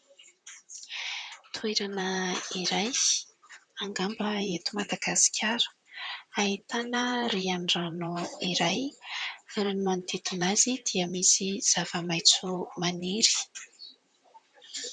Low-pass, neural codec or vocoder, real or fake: 9.9 kHz; vocoder, 44.1 kHz, 128 mel bands, Pupu-Vocoder; fake